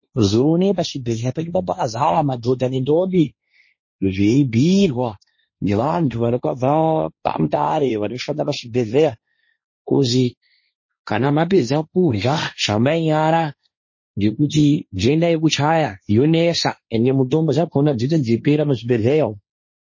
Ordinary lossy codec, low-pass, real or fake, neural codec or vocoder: MP3, 32 kbps; 7.2 kHz; fake; codec, 16 kHz, 1.1 kbps, Voila-Tokenizer